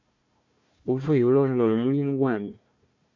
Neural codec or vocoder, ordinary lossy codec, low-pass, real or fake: codec, 16 kHz, 1 kbps, FunCodec, trained on Chinese and English, 50 frames a second; MP3, 48 kbps; 7.2 kHz; fake